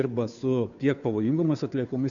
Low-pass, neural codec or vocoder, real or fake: 7.2 kHz; codec, 16 kHz, 2 kbps, FunCodec, trained on Chinese and English, 25 frames a second; fake